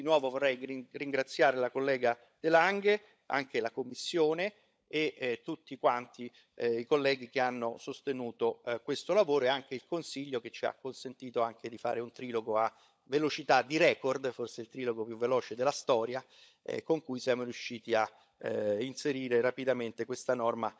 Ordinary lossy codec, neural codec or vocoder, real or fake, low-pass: none; codec, 16 kHz, 16 kbps, FunCodec, trained on LibriTTS, 50 frames a second; fake; none